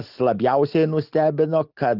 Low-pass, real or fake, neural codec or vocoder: 5.4 kHz; real; none